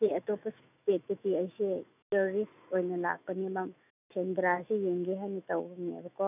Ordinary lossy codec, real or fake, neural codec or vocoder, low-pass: none; fake; autoencoder, 48 kHz, 128 numbers a frame, DAC-VAE, trained on Japanese speech; 3.6 kHz